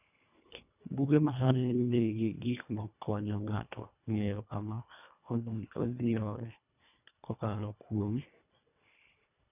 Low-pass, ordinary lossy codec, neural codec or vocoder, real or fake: 3.6 kHz; none; codec, 24 kHz, 1.5 kbps, HILCodec; fake